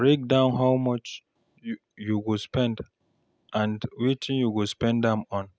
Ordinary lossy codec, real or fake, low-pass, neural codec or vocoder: none; real; none; none